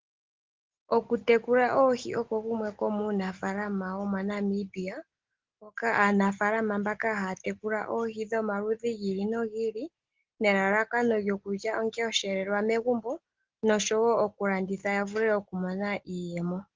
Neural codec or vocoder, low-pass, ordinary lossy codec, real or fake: none; 7.2 kHz; Opus, 16 kbps; real